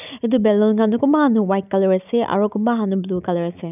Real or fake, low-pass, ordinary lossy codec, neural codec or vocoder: real; 3.6 kHz; none; none